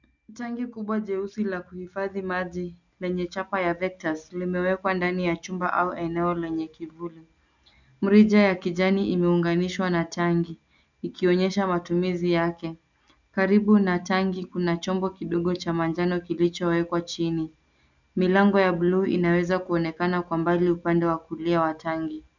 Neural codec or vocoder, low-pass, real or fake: none; 7.2 kHz; real